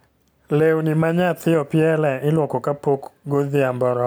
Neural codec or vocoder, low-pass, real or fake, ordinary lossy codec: none; none; real; none